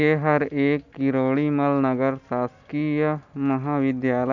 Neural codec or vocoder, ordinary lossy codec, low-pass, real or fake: none; none; 7.2 kHz; real